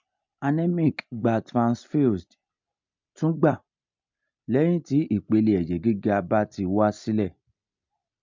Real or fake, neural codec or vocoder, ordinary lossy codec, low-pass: real; none; MP3, 64 kbps; 7.2 kHz